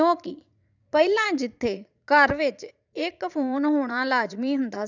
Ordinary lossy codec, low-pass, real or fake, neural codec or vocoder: none; 7.2 kHz; fake; vocoder, 44.1 kHz, 80 mel bands, Vocos